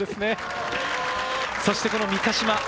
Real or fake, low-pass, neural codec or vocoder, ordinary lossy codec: real; none; none; none